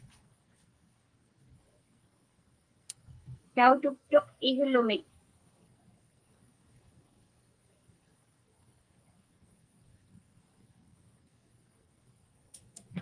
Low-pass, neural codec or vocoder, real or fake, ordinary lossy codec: 9.9 kHz; codec, 44.1 kHz, 2.6 kbps, SNAC; fake; Opus, 32 kbps